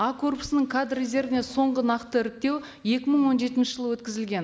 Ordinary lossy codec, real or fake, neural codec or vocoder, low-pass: none; real; none; none